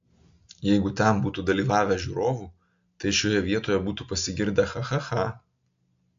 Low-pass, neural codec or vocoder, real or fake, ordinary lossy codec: 7.2 kHz; none; real; AAC, 64 kbps